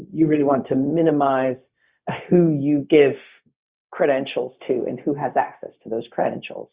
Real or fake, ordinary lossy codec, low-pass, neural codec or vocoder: fake; Opus, 64 kbps; 3.6 kHz; codec, 16 kHz, 0.4 kbps, LongCat-Audio-Codec